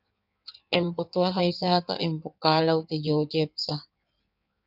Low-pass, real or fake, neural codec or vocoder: 5.4 kHz; fake; codec, 16 kHz in and 24 kHz out, 1.1 kbps, FireRedTTS-2 codec